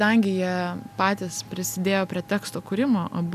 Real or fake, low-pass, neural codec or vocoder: real; 14.4 kHz; none